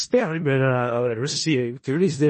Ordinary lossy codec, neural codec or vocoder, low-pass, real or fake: MP3, 32 kbps; codec, 16 kHz in and 24 kHz out, 0.4 kbps, LongCat-Audio-Codec, four codebook decoder; 10.8 kHz; fake